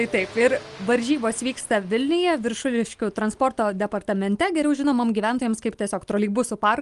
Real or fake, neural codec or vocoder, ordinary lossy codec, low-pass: real; none; Opus, 32 kbps; 10.8 kHz